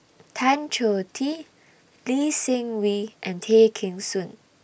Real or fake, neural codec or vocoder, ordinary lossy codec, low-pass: real; none; none; none